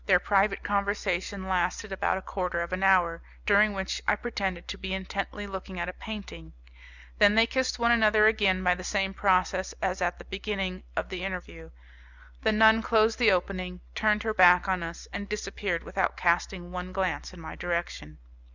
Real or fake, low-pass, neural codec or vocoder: real; 7.2 kHz; none